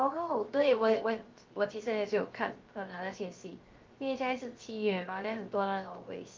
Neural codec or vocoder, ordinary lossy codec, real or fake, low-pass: codec, 16 kHz, 0.7 kbps, FocalCodec; Opus, 32 kbps; fake; 7.2 kHz